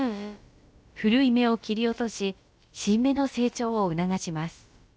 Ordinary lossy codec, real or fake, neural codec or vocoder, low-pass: none; fake; codec, 16 kHz, about 1 kbps, DyCAST, with the encoder's durations; none